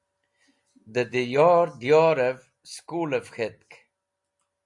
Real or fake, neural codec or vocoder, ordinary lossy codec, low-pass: real; none; MP3, 64 kbps; 10.8 kHz